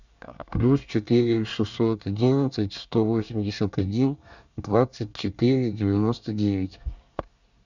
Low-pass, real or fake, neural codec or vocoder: 7.2 kHz; fake; codec, 24 kHz, 1 kbps, SNAC